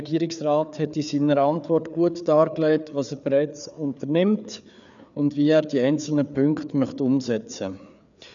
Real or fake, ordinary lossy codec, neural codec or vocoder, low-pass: fake; none; codec, 16 kHz, 4 kbps, FreqCodec, larger model; 7.2 kHz